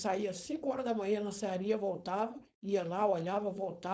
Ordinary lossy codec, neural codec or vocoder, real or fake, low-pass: none; codec, 16 kHz, 4.8 kbps, FACodec; fake; none